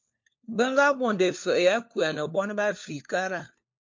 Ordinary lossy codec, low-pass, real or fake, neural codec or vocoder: MP3, 48 kbps; 7.2 kHz; fake; codec, 16 kHz, 4 kbps, FunCodec, trained on LibriTTS, 50 frames a second